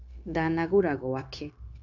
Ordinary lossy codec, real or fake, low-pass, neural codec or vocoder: none; fake; 7.2 kHz; codec, 16 kHz, 0.9 kbps, LongCat-Audio-Codec